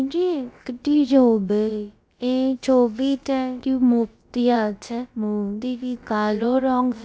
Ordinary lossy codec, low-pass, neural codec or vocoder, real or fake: none; none; codec, 16 kHz, about 1 kbps, DyCAST, with the encoder's durations; fake